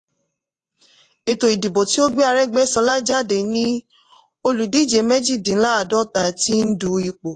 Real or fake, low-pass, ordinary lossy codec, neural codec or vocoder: fake; 10.8 kHz; AAC, 48 kbps; vocoder, 24 kHz, 100 mel bands, Vocos